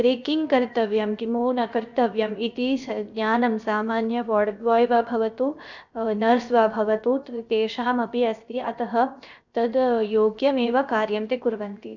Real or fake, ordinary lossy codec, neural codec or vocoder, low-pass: fake; none; codec, 16 kHz, 0.7 kbps, FocalCodec; 7.2 kHz